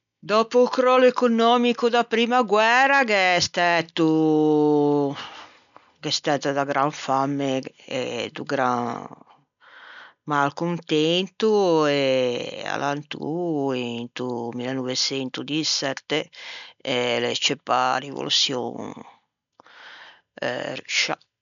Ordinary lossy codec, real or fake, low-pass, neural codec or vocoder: none; real; 7.2 kHz; none